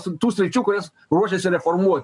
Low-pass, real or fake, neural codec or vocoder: 10.8 kHz; real; none